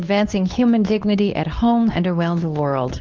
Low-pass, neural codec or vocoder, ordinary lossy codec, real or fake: 7.2 kHz; codec, 24 kHz, 0.9 kbps, WavTokenizer, medium speech release version 2; Opus, 32 kbps; fake